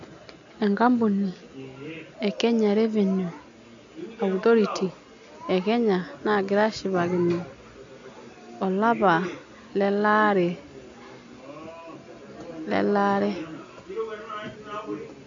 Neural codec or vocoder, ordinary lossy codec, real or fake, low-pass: none; AAC, 64 kbps; real; 7.2 kHz